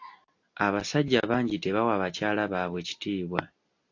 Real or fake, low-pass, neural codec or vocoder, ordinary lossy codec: real; 7.2 kHz; none; AAC, 48 kbps